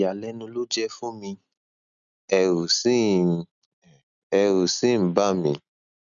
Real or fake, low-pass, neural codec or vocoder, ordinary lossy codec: real; 7.2 kHz; none; none